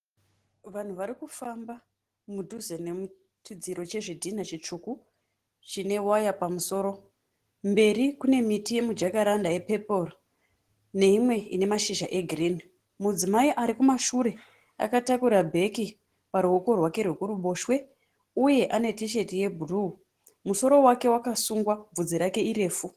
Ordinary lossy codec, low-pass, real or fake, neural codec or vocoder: Opus, 16 kbps; 14.4 kHz; real; none